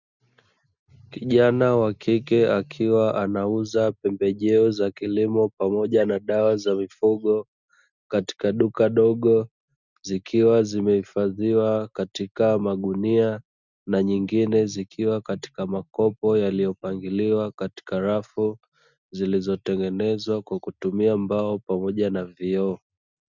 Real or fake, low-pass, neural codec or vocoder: real; 7.2 kHz; none